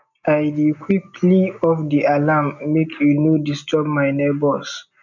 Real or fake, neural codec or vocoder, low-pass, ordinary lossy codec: real; none; 7.2 kHz; none